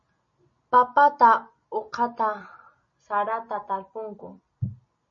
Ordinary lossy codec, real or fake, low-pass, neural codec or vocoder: MP3, 32 kbps; real; 7.2 kHz; none